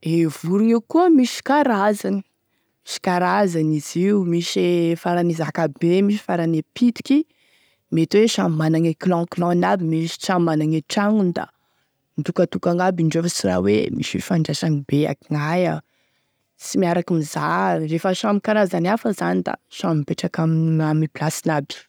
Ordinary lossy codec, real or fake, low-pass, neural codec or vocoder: none; real; none; none